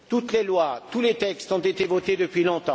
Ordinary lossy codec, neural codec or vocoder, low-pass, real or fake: none; none; none; real